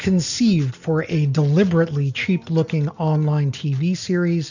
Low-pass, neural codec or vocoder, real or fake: 7.2 kHz; none; real